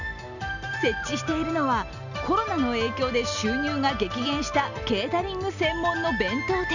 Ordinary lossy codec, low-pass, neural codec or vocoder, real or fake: none; 7.2 kHz; none; real